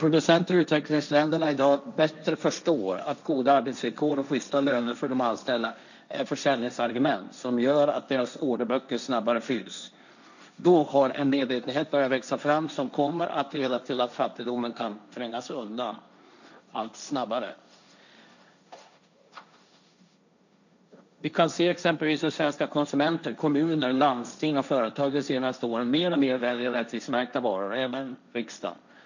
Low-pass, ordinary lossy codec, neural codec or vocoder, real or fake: 7.2 kHz; none; codec, 16 kHz, 1.1 kbps, Voila-Tokenizer; fake